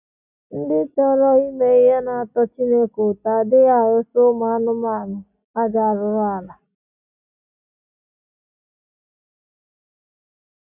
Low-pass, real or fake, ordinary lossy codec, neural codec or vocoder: 3.6 kHz; real; none; none